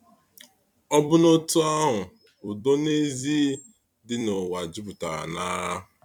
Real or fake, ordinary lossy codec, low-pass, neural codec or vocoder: fake; none; 19.8 kHz; vocoder, 44.1 kHz, 128 mel bands every 256 samples, BigVGAN v2